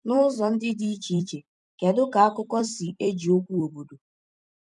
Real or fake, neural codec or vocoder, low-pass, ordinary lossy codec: fake; vocoder, 44.1 kHz, 128 mel bands every 256 samples, BigVGAN v2; 10.8 kHz; none